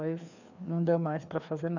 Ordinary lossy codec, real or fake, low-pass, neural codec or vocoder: none; fake; 7.2 kHz; codec, 16 kHz, 2 kbps, FreqCodec, larger model